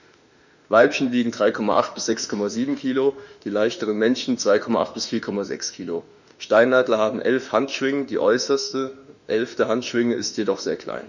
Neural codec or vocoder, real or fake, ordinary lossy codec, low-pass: autoencoder, 48 kHz, 32 numbers a frame, DAC-VAE, trained on Japanese speech; fake; none; 7.2 kHz